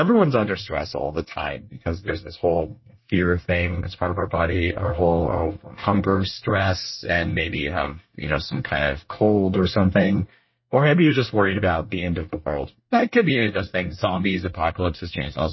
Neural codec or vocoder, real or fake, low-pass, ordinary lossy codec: codec, 24 kHz, 1 kbps, SNAC; fake; 7.2 kHz; MP3, 24 kbps